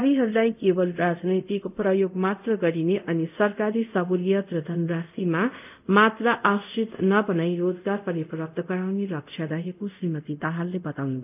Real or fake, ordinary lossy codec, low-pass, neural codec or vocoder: fake; none; 3.6 kHz; codec, 24 kHz, 0.5 kbps, DualCodec